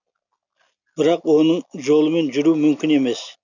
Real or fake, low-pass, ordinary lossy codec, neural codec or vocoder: real; 7.2 kHz; none; none